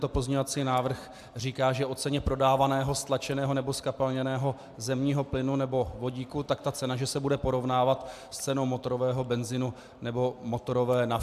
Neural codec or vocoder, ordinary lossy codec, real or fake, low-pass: none; AAC, 96 kbps; real; 14.4 kHz